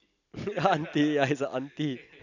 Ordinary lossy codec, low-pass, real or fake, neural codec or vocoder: none; 7.2 kHz; real; none